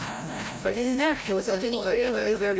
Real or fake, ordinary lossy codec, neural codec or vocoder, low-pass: fake; none; codec, 16 kHz, 0.5 kbps, FreqCodec, larger model; none